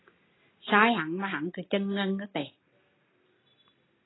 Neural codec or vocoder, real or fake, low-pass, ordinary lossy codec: none; real; 7.2 kHz; AAC, 16 kbps